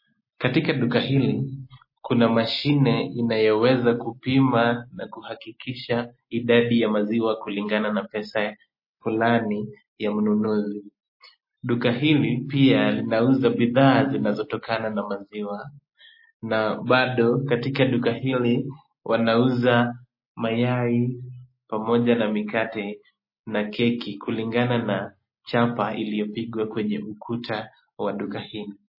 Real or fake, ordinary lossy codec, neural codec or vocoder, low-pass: real; MP3, 24 kbps; none; 5.4 kHz